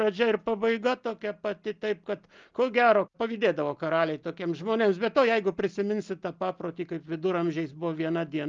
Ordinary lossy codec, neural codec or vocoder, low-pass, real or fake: Opus, 32 kbps; none; 7.2 kHz; real